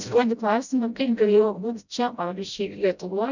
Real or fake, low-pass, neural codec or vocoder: fake; 7.2 kHz; codec, 16 kHz, 0.5 kbps, FreqCodec, smaller model